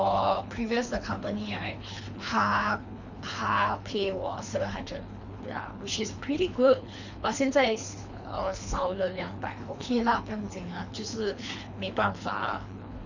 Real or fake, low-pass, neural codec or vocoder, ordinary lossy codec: fake; 7.2 kHz; codec, 24 kHz, 3 kbps, HILCodec; none